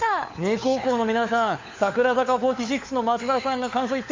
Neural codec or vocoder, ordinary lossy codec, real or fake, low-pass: codec, 16 kHz, 4 kbps, FunCodec, trained on LibriTTS, 50 frames a second; MP3, 48 kbps; fake; 7.2 kHz